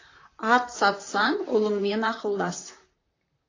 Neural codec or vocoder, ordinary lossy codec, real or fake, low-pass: vocoder, 44.1 kHz, 128 mel bands, Pupu-Vocoder; AAC, 32 kbps; fake; 7.2 kHz